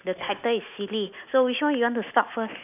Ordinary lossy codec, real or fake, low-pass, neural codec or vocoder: none; real; 3.6 kHz; none